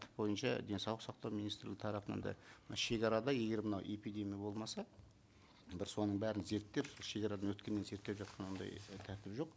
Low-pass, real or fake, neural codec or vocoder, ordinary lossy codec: none; real; none; none